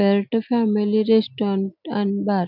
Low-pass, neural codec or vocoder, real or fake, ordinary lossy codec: 5.4 kHz; none; real; none